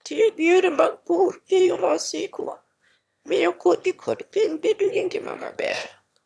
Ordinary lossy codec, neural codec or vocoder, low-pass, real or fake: none; autoencoder, 22.05 kHz, a latent of 192 numbers a frame, VITS, trained on one speaker; none; fake